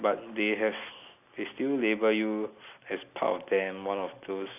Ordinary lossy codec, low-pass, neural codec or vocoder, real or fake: none; 3.6 kHz; none; real